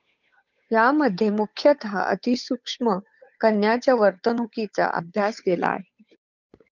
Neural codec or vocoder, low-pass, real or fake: codec, 16 kHz, 8 kbps, FunCodec, trained on Chinese and English, 25 frames a second; 7.2 kHz; fake